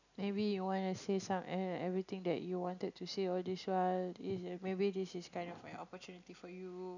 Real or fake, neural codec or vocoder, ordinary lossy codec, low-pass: real; none; none; 7.2 kHz